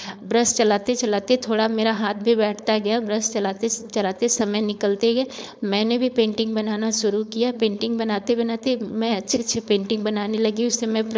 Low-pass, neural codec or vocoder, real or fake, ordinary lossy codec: none; codec, 16 kHz, 4.8 kbps, FACodec; fake; none